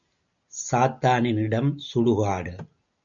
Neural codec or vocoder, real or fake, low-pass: none; real; 7.2 kHz